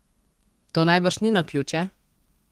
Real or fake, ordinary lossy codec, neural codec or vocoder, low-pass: fake; Opus, 24 kbps; codec, 32 kHz, 1.9 kbps, SNAC; 14.4 kHz